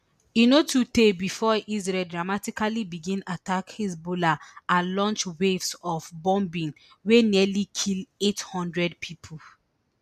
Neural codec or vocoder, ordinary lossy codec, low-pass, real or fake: none; none; 14.4 kHz; real